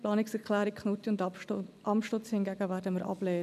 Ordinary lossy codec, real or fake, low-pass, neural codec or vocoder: none; real; 14.4 kHz; none